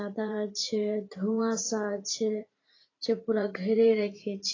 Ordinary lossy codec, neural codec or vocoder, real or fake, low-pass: AAC, 32 kbps; vocoder, 44.1 kHz, 128 mel bands every 512 samples, BigVGAN v2; fake; 7.2 kHz